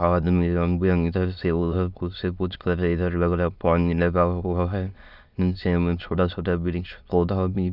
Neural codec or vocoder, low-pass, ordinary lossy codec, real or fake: autoencoder, 22.05 kHz, a latent of 192 numbers a frame, VITS, trained on many speakers; 5.4 kHz; none; fake